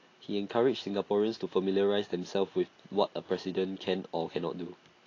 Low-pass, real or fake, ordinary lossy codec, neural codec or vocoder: 7.2 kHz; real; AAC, 32 kbps; none